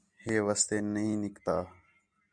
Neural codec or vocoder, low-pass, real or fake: none; 9.9 kHz; real